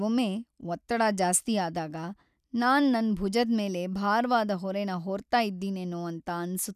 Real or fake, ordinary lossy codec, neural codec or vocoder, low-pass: real; none; none; 14.4 kHz